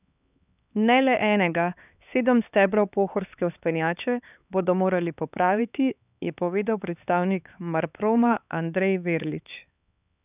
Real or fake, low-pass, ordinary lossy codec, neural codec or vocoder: fake; 3.6 kHz; none; codec, 16 kHz, 4 kbps, X-Codec, HuBERT features, trained on LibriSpeech